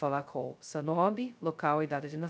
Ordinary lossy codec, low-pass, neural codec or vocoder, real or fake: none; none; codec, 16 kHz, 0.2 kbps, FocalCodec; fake